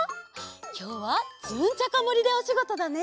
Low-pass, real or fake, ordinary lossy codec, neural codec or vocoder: none; real; none; none